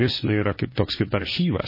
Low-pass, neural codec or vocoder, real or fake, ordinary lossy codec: 5.4 kHz; codec, 16 kHz, 1.1 kbps, Voila-Tokenizer; fake; MP3, 24 kbps